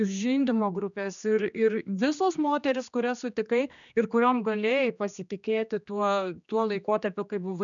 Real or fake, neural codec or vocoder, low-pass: fake; codec, 16 kHz, 2 kbps, X-Codec, HuBERT features, trained on general audio; 7.2 kHz